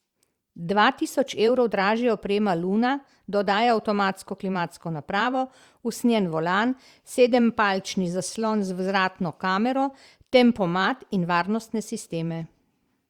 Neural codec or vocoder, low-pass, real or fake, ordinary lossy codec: vocoder, 44.1 kHz, 128 mel bands every 512 samples, BigVGAN v2; 19.8 kHz; fake; Opus, 64 kbps